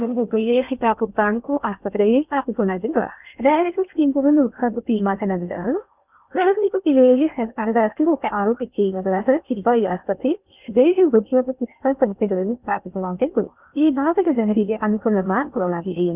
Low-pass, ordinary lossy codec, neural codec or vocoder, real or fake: 3.6 kHz; none; codec, 16 kHz in and 24 kHz out, 0.6 kbps, FocalCodec, streaming, 2048 codes; fake